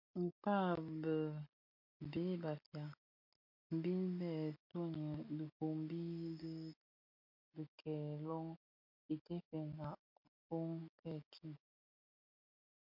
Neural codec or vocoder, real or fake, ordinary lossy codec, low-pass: none; real; MP3, 48 kbps; 5.4 kHz